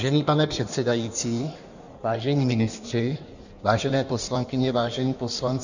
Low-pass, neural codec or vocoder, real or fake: 7.2 kHz; codec, 16 kHz in and 24 kHz out, 1.1 kbps, FireRedTTS-2 codec; fake